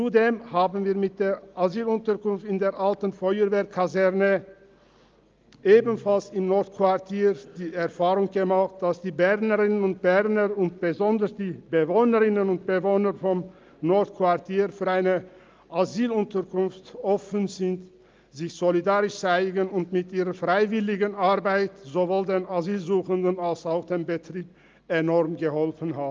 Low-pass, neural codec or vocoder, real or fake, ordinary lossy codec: 7.2 kHz; none; real; Opus, 24 kbps